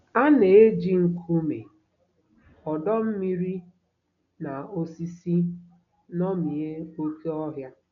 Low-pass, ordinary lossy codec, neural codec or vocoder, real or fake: 7.2 kHz; none; none; real